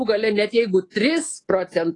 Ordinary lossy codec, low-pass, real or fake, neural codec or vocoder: AAC, 32 kbps; 10.8 kHz; fake; codec, 24 kHz, 3.1 kbps, DualCodec